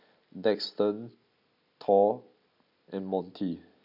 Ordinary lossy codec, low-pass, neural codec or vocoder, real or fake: none; 5.4 kHz; none; real